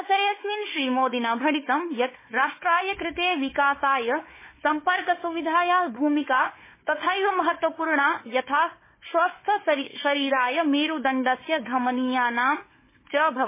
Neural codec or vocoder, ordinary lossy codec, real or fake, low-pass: codec, 16 kHz, 4 kbps, FunCodec, trained on Chinese and English, 50 frames a second; MP3, 16 kbps; fake; 3.6 kHz